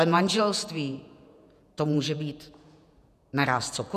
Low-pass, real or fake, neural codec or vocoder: 14.4 kHz; fake; vocoder, 48 kHz, 128 mel bands, Vocos